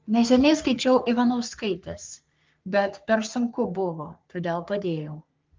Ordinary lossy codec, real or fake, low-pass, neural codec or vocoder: Opus, 32 kbps; fake; 7.2 kHz; codec, 44.1 kHz, 3.4 kbps, Pupu-Codec